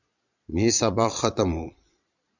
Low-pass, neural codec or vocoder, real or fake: 7.2 kHz; vocoder, 22.05 kHz, 80 mel bands, Vocos; fake